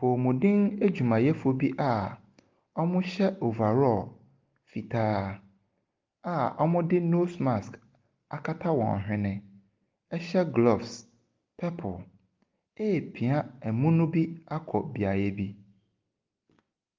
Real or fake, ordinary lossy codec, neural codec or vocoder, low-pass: real; Opus, 32 kbps; none; 7.2 kHz